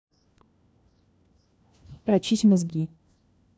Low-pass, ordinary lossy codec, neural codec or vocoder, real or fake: none; none; codec, 16 kHz, 1 kbps, FunCodec, trained on LibriTTS, 50 frames a second; fake